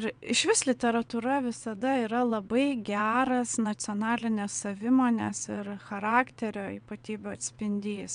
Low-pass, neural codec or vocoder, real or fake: 9.9 kHz; vocoder, 22.05 kHz, 80 mel bands, WaveNeXt; fake